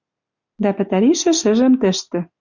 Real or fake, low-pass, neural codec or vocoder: real; 7.2 kHz; none